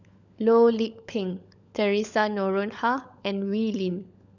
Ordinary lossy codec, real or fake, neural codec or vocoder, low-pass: none; fake; codec, 16 kHz, 16 kbps, FunCodec, trained on LibriTTS, 50 frames a second; 7.2 kHz